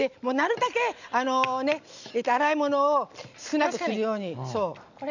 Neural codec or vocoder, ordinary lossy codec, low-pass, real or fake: none; none; 7.2 kHz; real